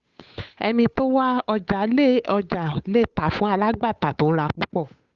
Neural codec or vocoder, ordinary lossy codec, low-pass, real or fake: codec, 16 kHz, 8 kbps, FunCodec, trained on Chinese and English, 25 frames a second; Opus, 64 kbps; 7.2 kHz; fake